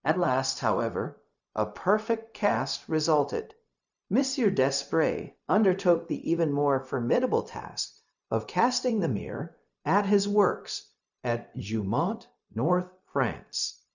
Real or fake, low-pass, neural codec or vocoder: fake; 7.2 kHz; codec, 16 kHz, 0.4 kbps, LongCat-Audio-Codec